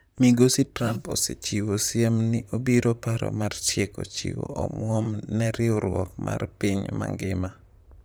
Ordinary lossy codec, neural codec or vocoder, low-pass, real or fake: none; vocoder, 44.1 kHz, 128 mel bands, Pupu-Vocoder; none; fake